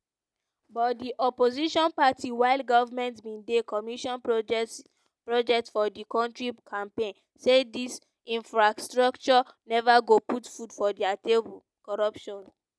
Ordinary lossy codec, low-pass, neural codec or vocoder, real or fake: none; none; none; real